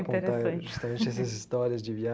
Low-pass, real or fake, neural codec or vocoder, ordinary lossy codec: none; real; none; none